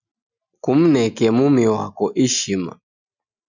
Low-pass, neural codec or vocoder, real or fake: 7.2 kHz; none; real